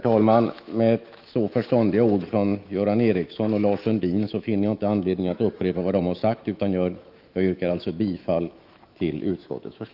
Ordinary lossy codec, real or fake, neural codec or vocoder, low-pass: Opus, 24 kbps; fake; autoencoder, 48 kHz, 128 numbers a frame, DAC-VAE, trained on Japanese speech; 5.4 kHz